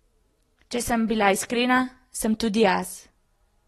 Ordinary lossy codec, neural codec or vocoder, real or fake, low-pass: AAC, 32 kbps; none; real; 19.8 kHz